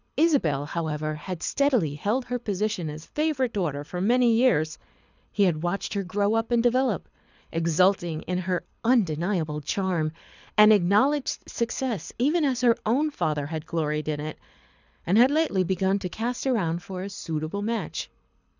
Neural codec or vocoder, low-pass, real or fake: codec, 24 kHz, 6 kbps, HILCodec; 7.2 kHz; fake